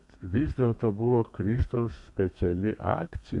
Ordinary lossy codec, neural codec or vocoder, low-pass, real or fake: MP3, 64 kbps; codec, 32 kHz, 1.9 kbps, SNAC; 10.8 kHz; fake